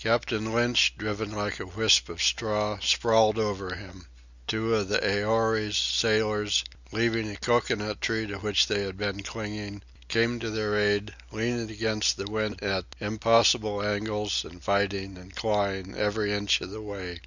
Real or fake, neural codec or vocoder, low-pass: real; none; 7.2 kHz